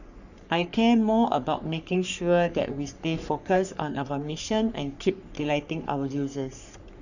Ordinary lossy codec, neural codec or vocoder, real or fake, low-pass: none; codec, 44.1 kHz, 3.4 kbps, Pupu-Codec; fake; 7.2 kHz